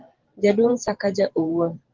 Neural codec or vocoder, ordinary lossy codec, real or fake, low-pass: vocoder, 44.1 kHz, 128 mel bands every 512 samples, BigVGAN v2; Opus, 16 kbps; fake; 7.2 kHz